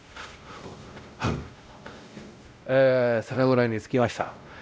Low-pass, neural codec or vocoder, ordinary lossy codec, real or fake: none; codec, 16 kHz, 0.5 kbps, X-Codec, WavLM features, trained on Multilingual LibriSpeech; none; fake